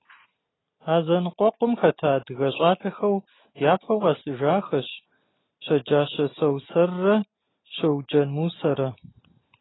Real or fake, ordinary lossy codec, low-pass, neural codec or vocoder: real; AAC, 16 kbps; 7.2 kHz; none